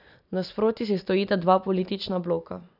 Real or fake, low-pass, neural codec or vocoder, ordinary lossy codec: real; 5.4 kHz; none; none